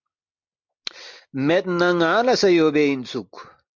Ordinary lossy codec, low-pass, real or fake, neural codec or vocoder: MP3, 64 kbps; 7.2 kHz; real; none